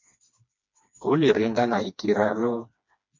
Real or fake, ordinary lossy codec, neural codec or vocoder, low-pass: fake; MP3, 48 kbps; codec, 16 kHz, 2 kbps, FreqCodec, smaller model; 7.2 kHz